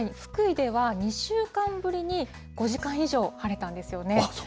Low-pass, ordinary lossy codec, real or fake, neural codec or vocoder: none; none; real; none